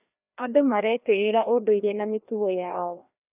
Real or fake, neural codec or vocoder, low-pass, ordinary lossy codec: fake; codec, 16 kHz, 1 kbps, FreqCodec, larger model; 3.6 kHz; none